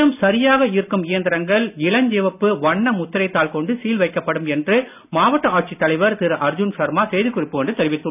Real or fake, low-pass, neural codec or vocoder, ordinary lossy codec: real; 3.6 kHz; none; none